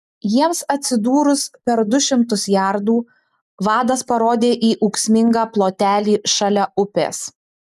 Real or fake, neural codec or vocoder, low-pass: real; none; 14.4 kHz